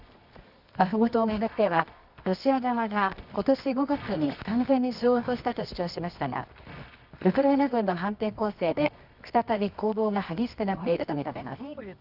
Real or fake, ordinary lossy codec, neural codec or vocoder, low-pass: fake; none; codec, 24 kHz, 0.9 kbps, WavTokenizer, medium music audio release; 5.4 kHz